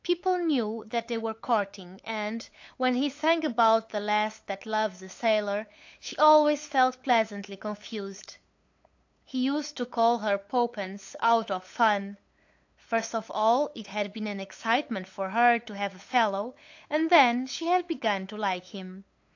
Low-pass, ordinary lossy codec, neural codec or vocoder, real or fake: 7.2 kHz; AAC, 48 kbps; codec, 16 kHz, 8 kbps, FunCodec, trained on LibriTTS, 25 frames a second; fake